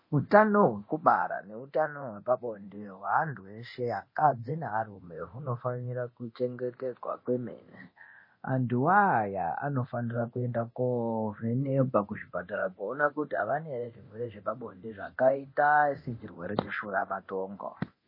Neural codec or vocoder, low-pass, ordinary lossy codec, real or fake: codec, 24 kHz, 0.9 kbps, DualCodec; 5.4 kHz; MP3, 24 kbps; fake